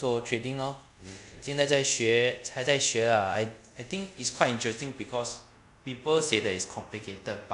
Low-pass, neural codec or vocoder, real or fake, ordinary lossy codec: 10.8 kHz; codec, 24 kHz, 0.5 kbps, DualCodec; fake; none